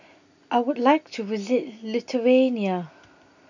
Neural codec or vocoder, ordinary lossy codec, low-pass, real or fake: none; none; 7.2 kHz; real